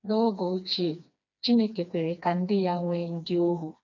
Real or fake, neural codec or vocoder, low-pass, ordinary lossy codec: fake; codec, 16 kHz, 2 kbps, FreqCodec, smaller model; 7.2 kHz; none